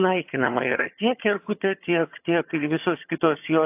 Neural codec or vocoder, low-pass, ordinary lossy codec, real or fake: vocoder, 22.05 kHz, 80 mel bands, HiFi-GAN; 3.6 kHz; AAC, 32 kbps; fake